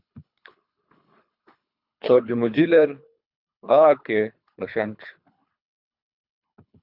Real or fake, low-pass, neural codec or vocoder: fake; 5.4 kHz; codec, 24 kHz, 3 kbps, HILCodec